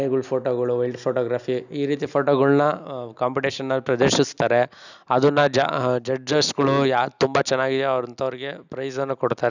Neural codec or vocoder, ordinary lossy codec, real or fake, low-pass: none; none; real; 7.2 kHz